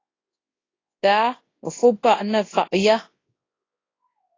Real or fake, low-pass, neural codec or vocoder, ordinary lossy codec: fake; 7.2 kHz; codec, 24 kHz, 0.9 kbps, WavTokenizer, large speech release; AAC, 32 kbps